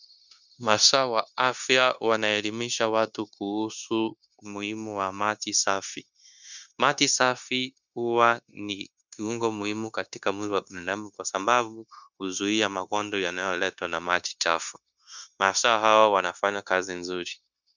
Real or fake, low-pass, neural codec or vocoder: fake; 7.2 kHz; codec, 16 kHz, 0.9 kbps, LongCat-Audio-Codec